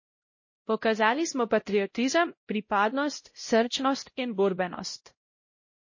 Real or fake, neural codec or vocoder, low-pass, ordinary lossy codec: fake; codec, 16 kHz, 0.5 kbps, X-Codec, WavLM features, trained on Multilingual LibriSpeech; 7.2 kHz; MP3, 32 kbps